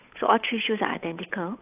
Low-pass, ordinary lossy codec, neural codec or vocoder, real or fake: 3.6 kHz; AAC, 32 kbps; codec, 16 kHz, 8 kbps, FunCodec, trained on Chinese and English, 25 frames a second; fake